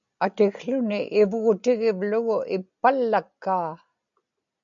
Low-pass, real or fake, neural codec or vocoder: 7.2 kHz; real; none